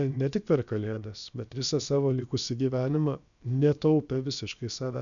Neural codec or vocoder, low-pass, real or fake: codec, 16 kHz, about 1 kbps, DyCAST, with the encoder's durations; 7.2 kHz; fake